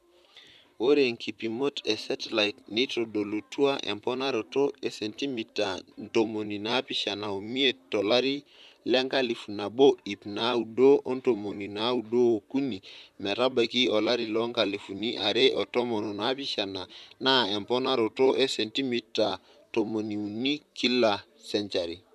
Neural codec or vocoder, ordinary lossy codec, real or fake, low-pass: vocoder, 44.1 kHz, 128 mel bands, Pupu-Vocoder; none; fake; 14.4 kHz